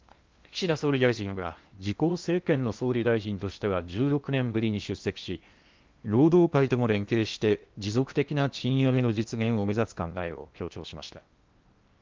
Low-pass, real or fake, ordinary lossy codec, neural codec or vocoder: 7.2 kHz; fake; Opus, 24 kbps; codec, 16 kHz in and 24 kHz out, 0.8 kbps, FocalCodec, streaming, 65536 codes